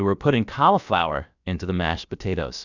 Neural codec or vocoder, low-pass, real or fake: codec, 16 kHz, about 1 kbps, DyCAST, with the encoder's durations; 7.2 kHz; fake